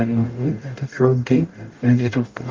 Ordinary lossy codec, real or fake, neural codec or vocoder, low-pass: Opus, 24 kbps; fake; codec, 44.1 kHz, 0.9 kbps, DAC; 7.2 kHz